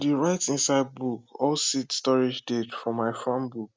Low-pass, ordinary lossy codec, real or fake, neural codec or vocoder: none; none; real; none